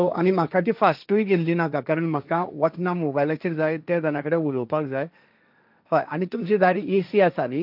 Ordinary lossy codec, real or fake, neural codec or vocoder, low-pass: none; fake; codec, 16 kHz, 1.1 kbps, Voila-Tokenizer; 5.4 kHz